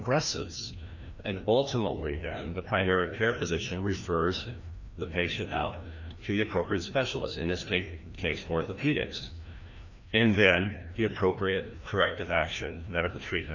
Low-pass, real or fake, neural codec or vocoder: 7.2 kHz; fake; codec, 16 kHz, 1 kbps, FreqCodec, larger model